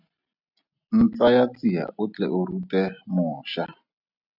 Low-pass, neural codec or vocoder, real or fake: 5.4 kHz; none; real